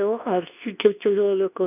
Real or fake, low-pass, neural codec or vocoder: fake; 3.6 kHz; codec, 24 kHz, 0.9 kbps, WavTokenizer, medium speech release version 2